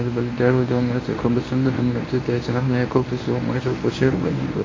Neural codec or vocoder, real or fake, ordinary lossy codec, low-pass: codec, 24 kHz, 0.9 kbps, WavTokenizer, medium speech release version 1; fake; AAC, 32 kbps; 7.2 kHz